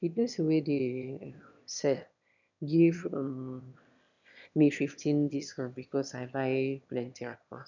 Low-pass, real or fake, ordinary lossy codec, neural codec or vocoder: 7.2 kHz; fake; none; autoencoder, 22.05 kHz, a latent of 192 numbers a frame, VITS, trained on one speaker